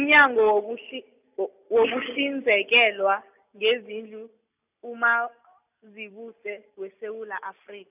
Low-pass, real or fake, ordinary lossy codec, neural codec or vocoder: 3.6 kHz; real; none; none